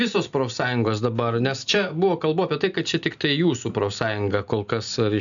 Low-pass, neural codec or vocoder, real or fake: 7.2 kHz; none; real